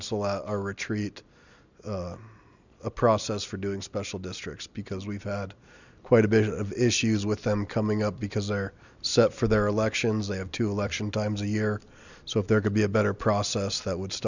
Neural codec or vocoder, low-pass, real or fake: none; 7.2 kHz; real